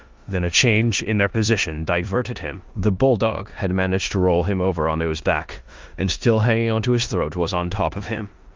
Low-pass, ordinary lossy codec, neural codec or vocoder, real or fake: 7.2 kHz; Opus, 32 kbps; codec, 16 kHz in and 24 kHz out, 0.9 kbps, LongCat-Audio-Codec, four codebook decoder; fake